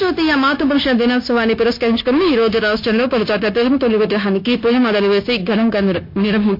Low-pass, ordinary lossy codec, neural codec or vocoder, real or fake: 5.4 kHz; MP3, 32 kbps; codec, 16 kHz, 0.9 kbps, LongCat-Audio-Codec; fake